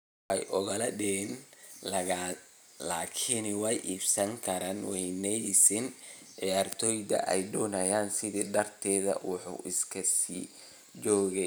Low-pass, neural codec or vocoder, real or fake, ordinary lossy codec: none; none; real; none